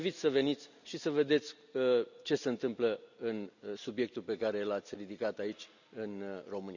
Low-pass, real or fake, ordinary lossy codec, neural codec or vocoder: 7.2 kHz; real; none; none